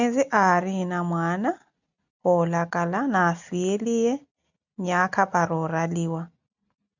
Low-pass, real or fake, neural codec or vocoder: 7.2 kHz; real; none